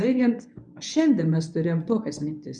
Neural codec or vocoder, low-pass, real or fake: codec, 24 kHz, 0.9 kbps, WavTokenizer, medium speech release version 1; 10.8 kHz; fake